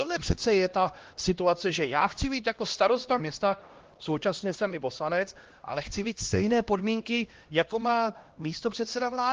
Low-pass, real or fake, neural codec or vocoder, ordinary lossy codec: 7.2 kHz; fake; codec, 16 kHz, 1 kbps, X-Codec, HuBERT features, trained on LibriSpeech; Opus, 16 kbps